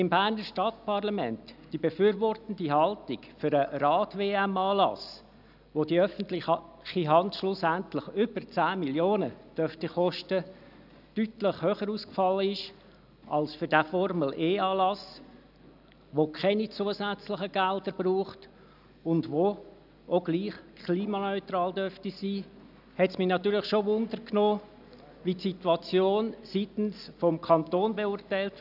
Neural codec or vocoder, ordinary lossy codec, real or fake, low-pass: none; none; real; 5.4 kHz